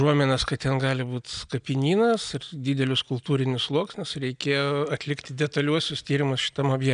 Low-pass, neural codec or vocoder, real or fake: 9.9 kHz; none; real